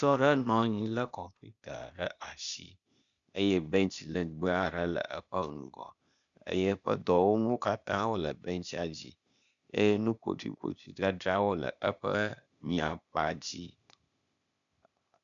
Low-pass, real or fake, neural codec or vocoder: 7.2 kHz; fake; codec, 16 kHz, 0.8 kbps, ZipCodec